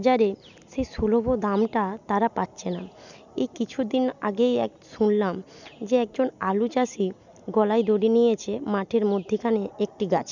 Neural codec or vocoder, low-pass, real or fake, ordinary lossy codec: none; 7.2 kHz; real; none